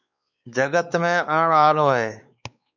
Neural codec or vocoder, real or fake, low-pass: codec, 16 kHz, 4 kbps, X-Codec, WavLM features, trained on Multilingual LibriSpeech; fake; 7.2 kHz